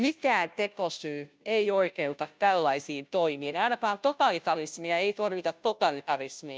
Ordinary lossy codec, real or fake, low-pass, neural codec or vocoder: none; fake; none; codec, 16 kHz, 0.5 kbps, FunCodec, trained on Chinese and English, 25 frames a second